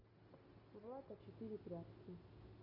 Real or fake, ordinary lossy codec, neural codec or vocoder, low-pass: real; AAC, 48 kbps; none; 5.4 kHz